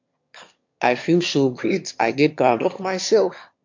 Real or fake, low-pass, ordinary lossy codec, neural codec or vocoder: fake; 7.2 kHz; MP3, 48 kbps; autoencoder, 22.05 kHz, a latent of 192 numbers a frame, VITS, trained on one speaker